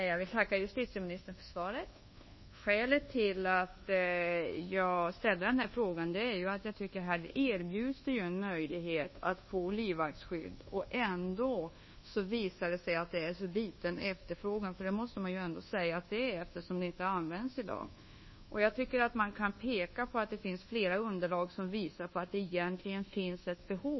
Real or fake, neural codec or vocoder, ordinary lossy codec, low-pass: fake; codec, 24 kHz, 1.2 kbps, DualCodec; MP3, 24 kbps; 7.2 kHz